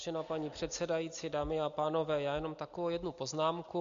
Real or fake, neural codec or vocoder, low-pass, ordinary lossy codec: real; none; 7.2 kHz; MP3, 48 kbps